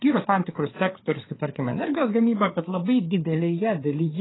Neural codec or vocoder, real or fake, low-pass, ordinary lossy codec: codec, 16 kHz, 4 kbps, FreqCodec, larger model; fake; 7.2 kHz; AAC, 16 kbps